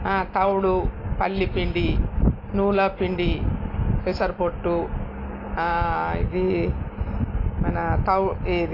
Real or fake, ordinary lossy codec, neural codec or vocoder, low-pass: real; AAC, 32 kbps; none; 5.4 kHz